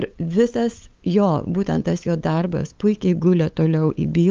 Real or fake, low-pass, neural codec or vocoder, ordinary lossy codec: fake; 7.2 kHz; codec, 16 kHz, 8 kbps, FunCodec, trained on LibriTTS, 25 frames a second; Opus, 32 kbps